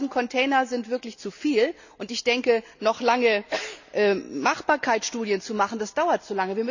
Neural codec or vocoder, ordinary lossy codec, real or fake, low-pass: none; none; real; 7.2 kHz